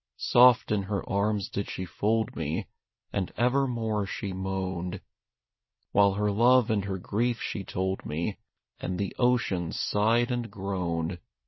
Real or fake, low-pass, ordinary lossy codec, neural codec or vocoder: real; 7.2 kHz; MP3, 24 kbps; none